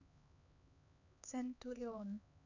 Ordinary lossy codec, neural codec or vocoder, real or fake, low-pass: none; codec, 16 kHz, 4 kbps, X-Codec, HuBERT features, trained on LibriSpeech; fake; 7.2 kHz